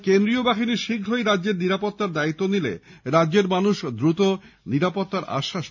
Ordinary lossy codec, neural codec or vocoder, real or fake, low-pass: none; none; real; 7.2 kHz